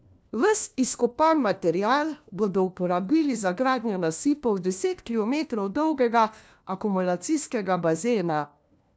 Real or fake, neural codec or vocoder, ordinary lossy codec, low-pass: fake; codec, 16 kHz, 1 kbps, FunCodec, trained on LibriTTS, 50 frames a second; none; none